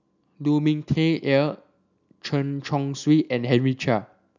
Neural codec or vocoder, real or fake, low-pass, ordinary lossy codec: none; real; 7.2 kHz; none